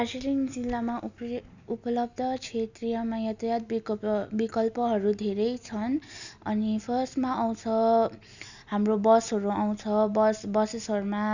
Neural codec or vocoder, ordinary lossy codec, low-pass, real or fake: none; none; 7.2 kHz; real